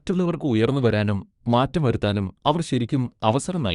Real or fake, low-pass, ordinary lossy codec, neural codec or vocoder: fake; 10.8 kHz; Opus, 64 kbps; codec, 24 kHz, 1 kbps, SNAC